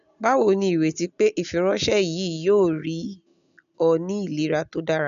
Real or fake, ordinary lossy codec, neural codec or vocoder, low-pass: real; none; none; 7.2 kHz